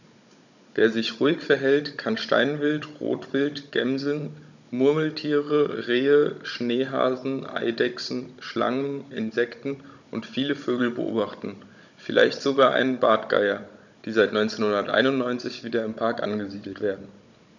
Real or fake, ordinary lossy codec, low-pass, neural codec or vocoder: fake; none; 7.2 kHz; codec, 16 kHz, 16 kbps, FunCodec, trained on Chinese and English, 50 frames a second